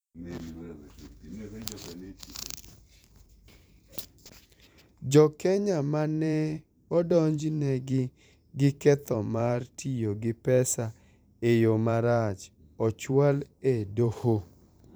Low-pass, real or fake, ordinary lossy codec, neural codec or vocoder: none; fake; none; vocoder, 44.1 kHz, 128 mel bands every 512 samples, BigVGAN v2